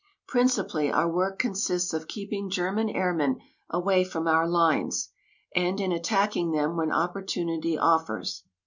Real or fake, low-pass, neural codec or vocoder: real; 7.2 kHz; none